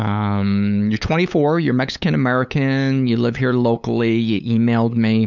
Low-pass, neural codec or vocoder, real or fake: 7.2 kHz; codec, 16 kHz, 8 kbps, FunCodec, trained on LibriTTS, 25 frames a second; fake